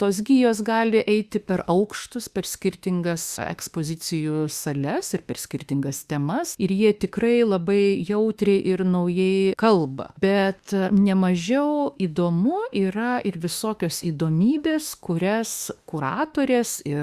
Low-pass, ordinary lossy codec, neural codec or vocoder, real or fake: 14.4 kHz; Opus, 64 kbps; autoencoder, 48 kHz, 32 numbers a frame, DAC-VAE, trained on Japanese speech; fake